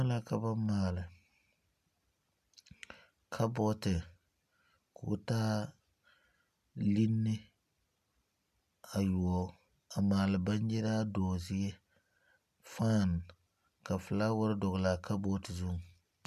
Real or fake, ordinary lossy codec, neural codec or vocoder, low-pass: real; MP3, 96 kbps; none; 14.4 kHz